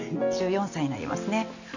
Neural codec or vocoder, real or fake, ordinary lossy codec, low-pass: none; real; none; 7.2 kHz